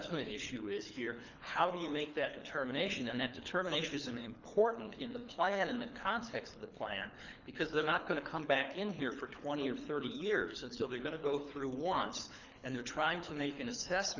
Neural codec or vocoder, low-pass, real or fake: codec, 24 kHz, 3 kbps, HILCodec; 7.2 kHz; fake